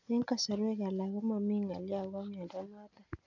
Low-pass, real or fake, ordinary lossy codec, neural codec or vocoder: 7.2 kHz; real; none; none